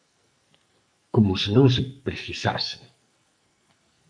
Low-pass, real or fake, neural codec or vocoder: 9.9 kHz; fake; codec, 44.1 kHz, 2.6 kbps, SNAC